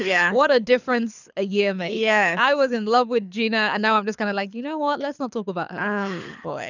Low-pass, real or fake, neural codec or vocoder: 7.2 kHz; fake; codec, 24 kHz, 6 kbps, HILCodec